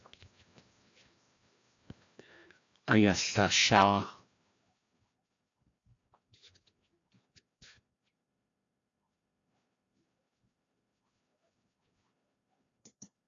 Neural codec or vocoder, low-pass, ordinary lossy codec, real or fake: codec, 16 kHz, 1 kbps, FreqCodec, larger model; 7.2 kHz; AAC, 48 kbps; fake